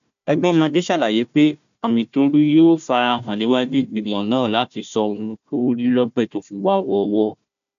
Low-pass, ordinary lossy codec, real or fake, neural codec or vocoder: 7.2 kHz; none; fake; codec, 16 kHz, 1 kbps, FunCodec, trained on Chinese and English, 50 frames a second